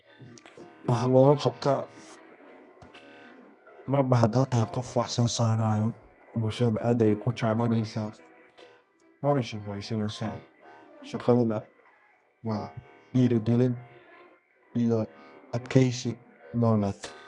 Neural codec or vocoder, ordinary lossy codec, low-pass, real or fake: codec, 24 kHz, 0.9 kbps, WavTokenizer, medium music audio release; none; 10.8 kHz; fake